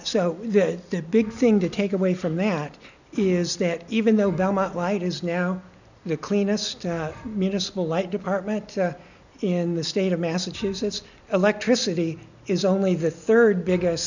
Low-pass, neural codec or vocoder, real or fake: 7.2 kHz; none; real